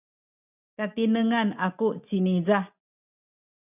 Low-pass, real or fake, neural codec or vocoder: 3.6 kHz; real; none